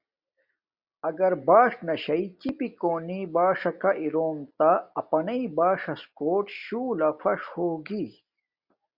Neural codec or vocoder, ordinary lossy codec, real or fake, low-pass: none; Opus, 64 kbps; real; 5.4 kHz